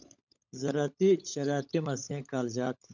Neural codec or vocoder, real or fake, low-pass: codec, 16 kHz, 16 kbps, FunCodec, trained on LibriTTS, 50 frames a second; fake; 7.2 kHz